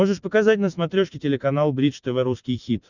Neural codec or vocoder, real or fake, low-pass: none; real; 7.2 kHz